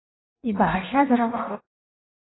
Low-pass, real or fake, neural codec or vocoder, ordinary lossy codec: 7.2 kHz; fake; codec, 16 kHz in and 24 kHz out, 0.6 kbps, FireRedTTS-2 codec; AAC, 16 kbps